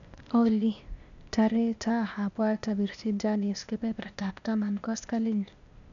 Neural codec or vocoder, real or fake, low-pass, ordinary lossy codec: codec, 16 kHz, 0.8 kbps, ZipCodec; fake; 7.2 kHz; none